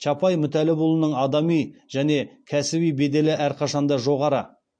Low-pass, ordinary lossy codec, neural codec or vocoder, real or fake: 9.9 kHz; MP3, 48 kbps; none; real